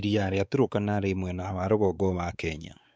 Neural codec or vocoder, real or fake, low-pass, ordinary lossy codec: codec, 16 kHz, 4 kbps, X-Codec, WavLM features, trained on Multilingual LibriSpeech; fake; none; none